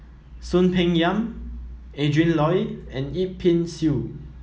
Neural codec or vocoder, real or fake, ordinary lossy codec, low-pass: none; real; none; none